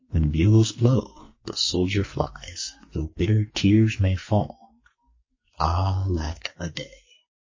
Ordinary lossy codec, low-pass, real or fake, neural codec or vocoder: MP3, 32 kbps; 7.2 kHz; fake; codec, 44.1 kHz, 2.6 kbps, SNAC